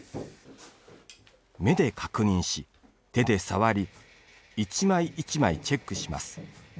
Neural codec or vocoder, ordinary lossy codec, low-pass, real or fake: none; none; none; real